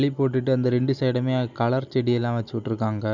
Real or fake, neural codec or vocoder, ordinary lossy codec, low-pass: real; none; none; 7.2 kHz